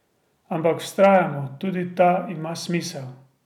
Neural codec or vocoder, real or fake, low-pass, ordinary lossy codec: none; real; 19.8 kHz; none